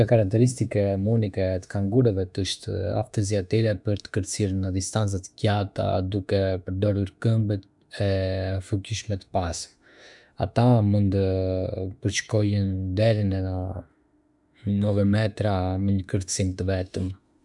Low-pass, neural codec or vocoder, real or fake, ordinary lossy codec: 10.8 kHz; autoencoder, 48 kHz, 32 numbers a frame, DAC-VAE, trained on Japanese speech; fake; none